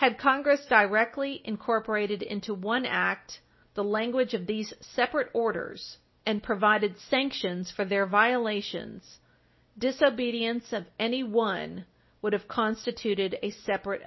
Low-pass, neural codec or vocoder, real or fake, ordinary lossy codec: 7.2 kHz; none; real; MP3, 24 kbps